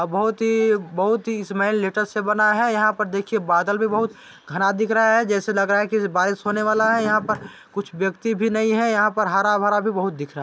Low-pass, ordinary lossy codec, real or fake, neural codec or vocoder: none; none; real; none